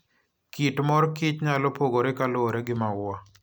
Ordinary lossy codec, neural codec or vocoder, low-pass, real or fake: none; none; none; real